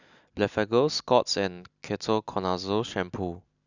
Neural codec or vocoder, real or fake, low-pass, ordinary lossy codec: none; real; 7.2 kHz; none